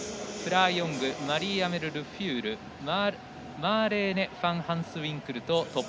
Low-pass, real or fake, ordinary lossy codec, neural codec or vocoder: none; real; none; none